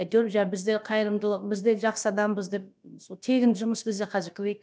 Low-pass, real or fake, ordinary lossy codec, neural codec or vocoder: none; fake; none; codec, 16 kHz, about 1 kbps, DyCAST, with the encoder's durations